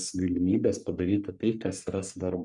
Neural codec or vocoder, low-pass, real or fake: codec, 44.1 kHz, 3.4 kbps, Pupu-Codec; 10.8 kHz; fake